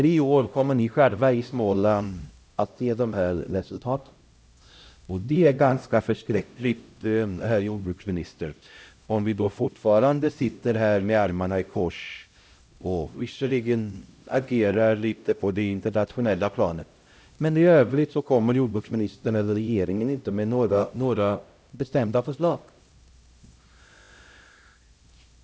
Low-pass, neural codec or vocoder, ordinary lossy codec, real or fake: none; codec, 16 kHz, 0.5 kbps, X-Codec, HuBERT features, trained on LibriSpeech; none; fake